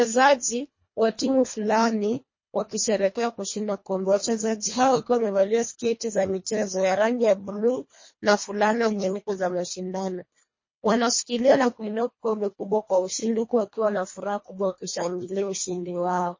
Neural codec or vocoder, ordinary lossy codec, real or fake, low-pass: codec, 24 kHz, 1.5 kbps, HILCodec; MP3, 32 kbps; fake; 7.2 kHz